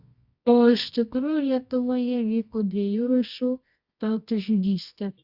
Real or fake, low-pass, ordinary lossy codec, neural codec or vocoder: fake; 5.4 kHz; Opus, 64 kbps; codec, 24 kHz, 0.9 kbps, WavTokenizer, medium music audio release